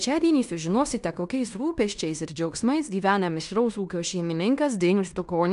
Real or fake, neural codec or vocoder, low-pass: fake; codec, 16 kHz in and 24 kHz out, 0.9 kbps, LongCat-Audio-Codec, fine tuned four codebook decoder; 10.8 kHz